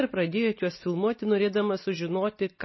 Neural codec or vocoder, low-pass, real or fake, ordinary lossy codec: none; 7.2 kHz; real; MP3, 24 kbps